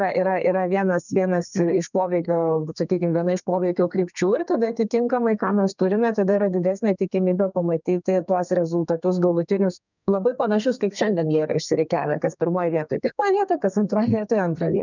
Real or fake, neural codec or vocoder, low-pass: fake; codec, 32 kHz, 1.9 kbps, SNAC; 7.2 kHz